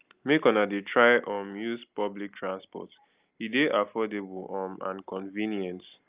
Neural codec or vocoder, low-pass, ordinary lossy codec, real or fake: none; 3.6 kHz; Opus, 24 kbps; real